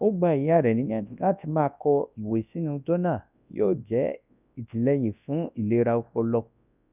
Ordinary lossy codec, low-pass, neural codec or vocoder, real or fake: none; 3.6 kHz; codec, 24 kHz, 0.9 kbps, WavTokenizer, large speech release; fake